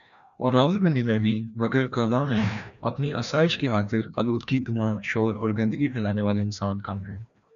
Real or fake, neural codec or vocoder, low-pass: fake; codec, 16 kHz, 1 kbps, FreqCodec, larger model; 7.2 kHz